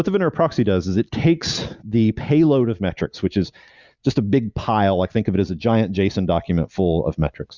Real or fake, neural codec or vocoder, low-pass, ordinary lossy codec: real; none; 7.2 kHz; Opus, 64 kbps